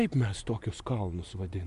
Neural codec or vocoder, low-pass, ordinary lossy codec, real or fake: none; 10.8 kHz; MP3, 96 kbps; real